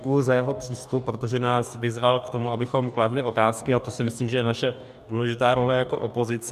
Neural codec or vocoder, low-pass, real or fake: codec, 44.1 kHz, 2.6 kbps, DAC; 14.4 kHz; fake